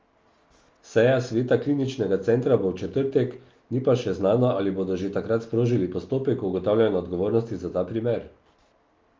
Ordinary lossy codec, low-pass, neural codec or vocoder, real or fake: Opus, 32 kbps; 7.2 kHz; none; real